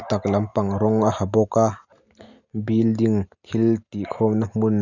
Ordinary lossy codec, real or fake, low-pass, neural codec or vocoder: none; real; 7.2 kHz; none